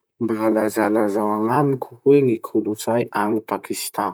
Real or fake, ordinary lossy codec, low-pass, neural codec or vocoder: fake; none; none; vocoder, 44.1 kHz, 128 mel bands, Pupu-Vocoder